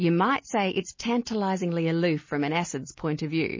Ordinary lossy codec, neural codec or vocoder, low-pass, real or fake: MP3, 32 kbps; none; 7.2 kHz; real